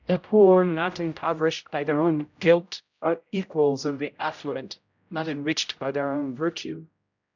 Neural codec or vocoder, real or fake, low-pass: codec, 16 kHz, 0.5 kbps, X-Codec, HuBERT features, trained on general audio; fake; 7.2 kHz